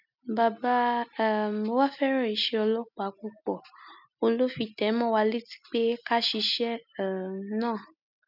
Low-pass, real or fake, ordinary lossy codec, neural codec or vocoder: 5.4 kHz; real; none; none